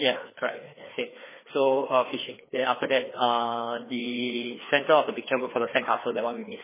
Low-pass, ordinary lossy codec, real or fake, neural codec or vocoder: 3.6 kHz; MP3, 16 kbps; fake; codec, 16 kHz, 2 kbps, FreqCodec, larger model